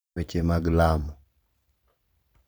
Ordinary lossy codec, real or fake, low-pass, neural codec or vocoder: none; fake; none; vocoder, 44.1 kHz, 128 mel bands every 512 samples, BigVGAN v2